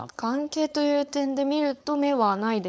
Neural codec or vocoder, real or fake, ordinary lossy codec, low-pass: codec, 16 kHz, 4.8 kbps, FACodec; fake; none; none